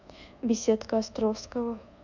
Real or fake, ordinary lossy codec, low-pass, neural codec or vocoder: fake; none; 7.2 kHz; codec, 24 kHz, 1.2 kbps, DualCodec